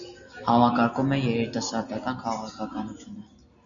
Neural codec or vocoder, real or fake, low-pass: none; real; 7.2 kHz